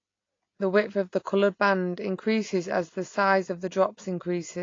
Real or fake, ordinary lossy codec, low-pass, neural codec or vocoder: real; AAC, 32 kbps; 7.2 kHz; none